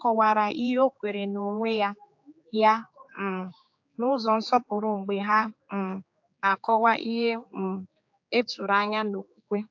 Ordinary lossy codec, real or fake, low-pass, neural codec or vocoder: AAC, 48 kbps; fake; 7.2 kHz; codec, 16 kHz, 4 kbps, X-Codec, HuBERT features, trained on general audio